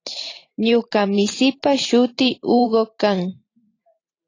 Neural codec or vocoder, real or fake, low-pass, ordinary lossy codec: vocoder, 44.1 kHz, 128 mel bands every 512 samples, BigVGAN v2; fake; 7.2 kHz; AAC, 32 kbps